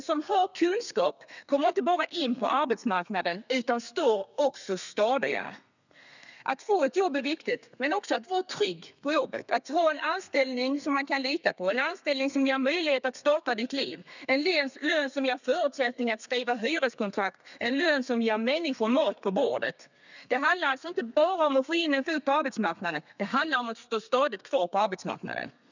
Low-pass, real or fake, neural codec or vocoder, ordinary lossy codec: 7.2 kHz; fake; codec, 32 kHz, 1.9 kbps, SNAC; none